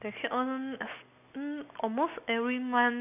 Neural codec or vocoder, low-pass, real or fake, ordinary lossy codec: none; 3.6 kHz; real; none